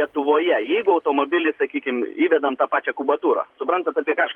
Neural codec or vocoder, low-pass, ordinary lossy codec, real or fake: vocoder, 44.1 kHz, 128 mel bands every 512 samples, BigVGAN v2; 19.8 kHz; Opus, 32 kbps; fake